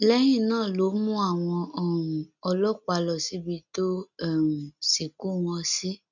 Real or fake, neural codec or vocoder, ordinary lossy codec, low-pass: real; none; none; 7.2 kHz